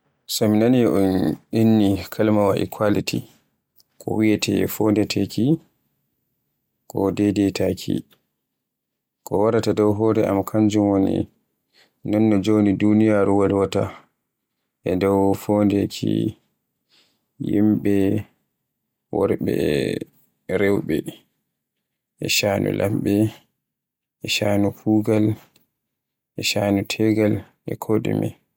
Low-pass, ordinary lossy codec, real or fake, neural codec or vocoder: 19.8 kHz; MP3, 96 kbps; real; none